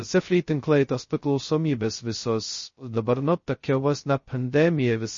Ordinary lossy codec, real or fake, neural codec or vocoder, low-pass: MP3, 32 kbps; fake; codec, 16 kHz, 0.2 kbps, FocalCodec; 7.2 kHz